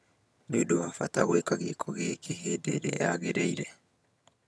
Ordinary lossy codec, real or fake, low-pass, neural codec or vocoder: none; fake; none; vocoder, 22.05 kHz, 80 mel bands, HiFi-GAN